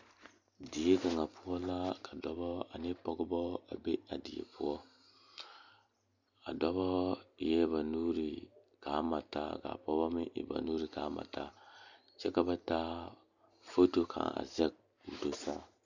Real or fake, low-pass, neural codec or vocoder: real; 7.2 kHz; none